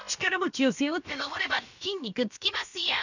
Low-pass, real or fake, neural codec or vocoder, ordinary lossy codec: 7.2 kHz; fake; codec, 16 kHz, about 1 kbps, DyCAST, with the encoder's durations; none